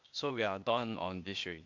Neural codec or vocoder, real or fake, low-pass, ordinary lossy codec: codec, 16 kHz, 0.8 kbps, ZipCodec; fake; 7.2 kHz; MP3, 64 kbps